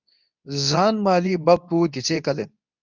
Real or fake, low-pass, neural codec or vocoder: fake; 7.2 kHz; codec, 24 kHz, 0.9 kbps, WavTokenizer, medium speech release version 2